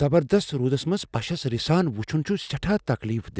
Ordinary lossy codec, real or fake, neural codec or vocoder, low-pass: none; real; none; none